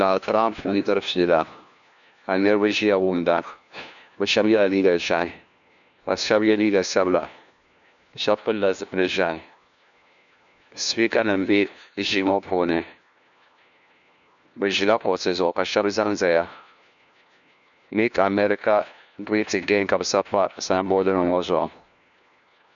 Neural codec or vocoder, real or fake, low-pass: codec, 16 kHz, 1 kbps, FunCodec, trained on LibriTTS, 50 frames a second; fake; 7.2 kHz